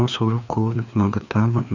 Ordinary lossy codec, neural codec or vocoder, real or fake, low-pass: none; codec, 16 kHz, 2 kbps, FreqCodec, larger model; fake; 7.2 kHz